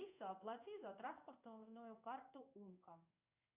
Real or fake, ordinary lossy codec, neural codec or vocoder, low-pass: fake; Opus, 64 kbps; codec, 16 kHz in and 24 kHz out, 1 kbps, XY-Tokenizer; 3.6 kHz